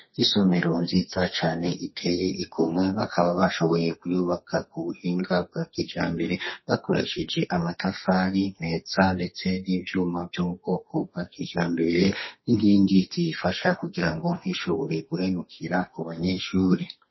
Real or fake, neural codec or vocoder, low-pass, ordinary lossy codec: fake; codec, 32 kHz, 1.9 kbps, SNAC; 7.2 kHz; MP3, 24 kbps